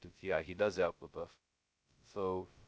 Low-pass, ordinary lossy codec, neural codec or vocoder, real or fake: none; none; codec, 16 kHz, 0.2 kbps, FocalCodec; fake